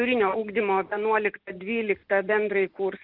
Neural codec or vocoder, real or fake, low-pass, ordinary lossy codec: none; real; 5.4 kHz; Opus, 32 kbps